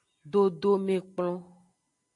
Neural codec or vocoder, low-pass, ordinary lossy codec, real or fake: none; 10.8 kHz; AAC, 48 kbps; real